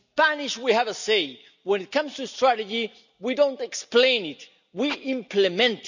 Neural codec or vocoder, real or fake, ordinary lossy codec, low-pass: none; real; none; 7.2 kHz